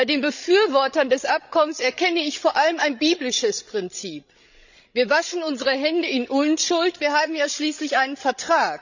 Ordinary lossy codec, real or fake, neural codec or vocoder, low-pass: none; fake; codec, 16 kHz, 16 kbps, FreqCodec, larger model; 7.2 kHz